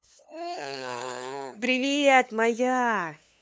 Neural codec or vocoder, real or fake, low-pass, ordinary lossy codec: codec, 16 kHz, 2 kbps, FunCodec, trained on LibriTTS, 25 frames a second; fake; none; none